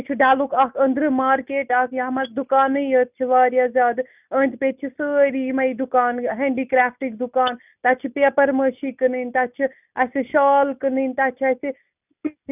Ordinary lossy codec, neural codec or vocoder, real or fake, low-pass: none; none; real; 3.6 kHz